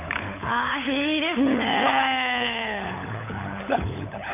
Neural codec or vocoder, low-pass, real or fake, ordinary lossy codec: codec, 16 kHz, 16 kbps, FunCodec, trained on LibriTTS, 50 frames a second; 3.6 kHz; fake; none